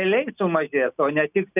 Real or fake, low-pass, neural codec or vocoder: real; 3.6 kHz; none